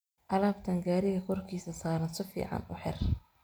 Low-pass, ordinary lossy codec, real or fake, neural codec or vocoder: none; none; real; none